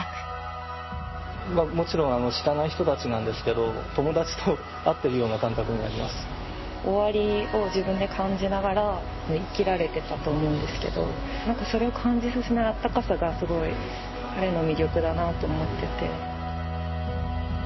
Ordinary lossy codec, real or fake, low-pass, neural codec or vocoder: MP3, 24 kbps; real; 7.2 kHz; none